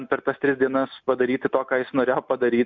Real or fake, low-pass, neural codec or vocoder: real; 7.2 kHz; none